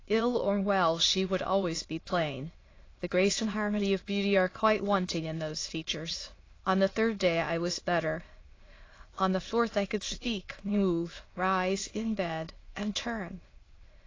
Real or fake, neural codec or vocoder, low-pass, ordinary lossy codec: fake; autoencoder, 22.05 kHz, a latent of 192 numbers a frame, VITS, trained on many speakers; 7.2 kHz; AAC, 32 kbps